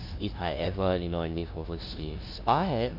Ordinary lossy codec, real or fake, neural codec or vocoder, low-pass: AAC, 48 kbps; fake; codec, 16 kHz, 0.5 kbps, FunCodec, trained on LibriTTS, 25 frames a second; 5.4 kHz